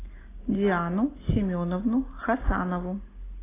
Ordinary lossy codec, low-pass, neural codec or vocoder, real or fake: AAC, 16 kbps; 3.6 kHz; none; real